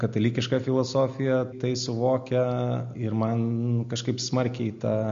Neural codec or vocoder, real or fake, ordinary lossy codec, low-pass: none; real; MP3, 48 kbps; 7.2 kHz